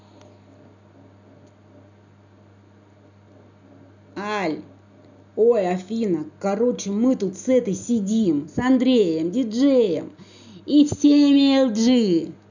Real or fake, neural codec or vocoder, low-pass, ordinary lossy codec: real; none; 7.2 kHz; none